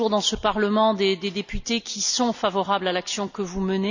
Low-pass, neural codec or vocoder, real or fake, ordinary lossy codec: 7.2 kHz; none; real; none